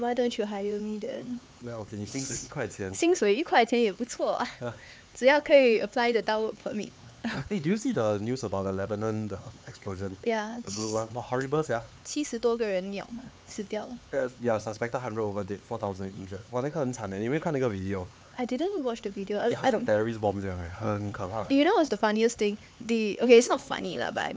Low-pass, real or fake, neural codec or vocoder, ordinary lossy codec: none; fake; codec, 16 kHz, 4 kbps, X-Codec, HuBERT features, trained on LibriSpeech; none